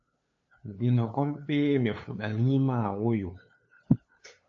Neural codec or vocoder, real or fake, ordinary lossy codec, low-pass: codec, 16 kHz, 2 kbps, FunCodec, trained on LibriTTS, 25 frames a second; fake; AAC, 48 kbps; 7.2 kHz